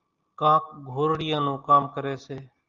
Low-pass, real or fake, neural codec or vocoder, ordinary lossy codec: 7.2 kHz; real; none; Opus, 32 kbps